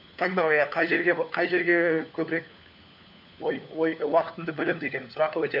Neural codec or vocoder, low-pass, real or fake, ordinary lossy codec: codec, 16 kHz, 4 kbps, FunCodec, trained on LibriTTS, 50 frames a second; 5.4 kHz; fake; none